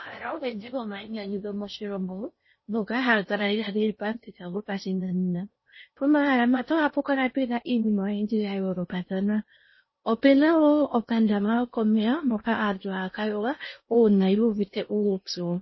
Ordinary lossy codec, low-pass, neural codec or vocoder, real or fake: MP3, 24 kbps; 7.2 kHz; codec, 16 kHz in and 24 kHz out, 0.6 kbps, FocalCodec, streaming, 4096 codes; fake